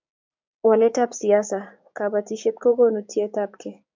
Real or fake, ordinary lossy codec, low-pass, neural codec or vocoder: fake; MP3, 64 kbps; 7.2 kHz; codec, 16 kHz, 6 kbps, DAC